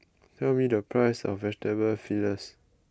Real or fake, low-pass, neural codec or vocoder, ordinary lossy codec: real; none; none; none